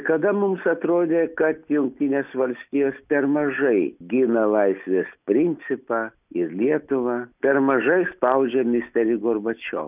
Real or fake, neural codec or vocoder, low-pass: real; none; 3.6 kHz